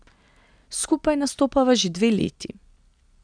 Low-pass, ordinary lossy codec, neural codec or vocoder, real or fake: 9.9 kHz; none; none; real